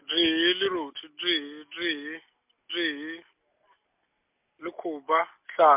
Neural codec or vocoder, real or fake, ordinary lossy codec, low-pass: none; real; MP3, 32 kbps; 3.6 kHz